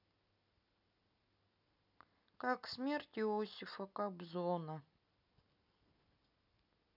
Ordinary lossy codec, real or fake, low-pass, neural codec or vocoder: none; real; 5.4 kHz; none